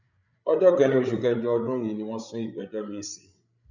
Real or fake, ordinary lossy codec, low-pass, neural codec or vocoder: fake; none; 7.2 kHz; codec, 16 kHz, 8 kbps, FreqCodec, larger model